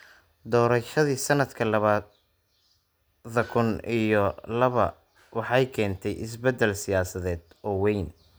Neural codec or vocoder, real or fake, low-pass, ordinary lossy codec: none; real; none; none